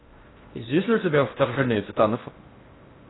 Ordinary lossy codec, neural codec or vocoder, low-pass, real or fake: AAC, 16 kbps; codec, 16 kHz in and 24 kHz out, 0.6 kbps, FocalCodec, streaming, 4096 codes; 7.2 kHz; fake